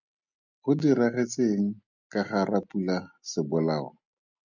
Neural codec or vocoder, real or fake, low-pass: none; real; 7.2 kHz